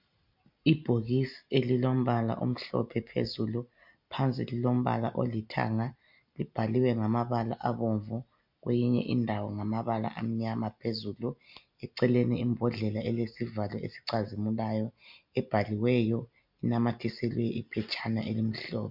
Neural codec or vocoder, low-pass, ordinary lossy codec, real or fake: none; 5.4 kHz; MP3, 48 kbps; real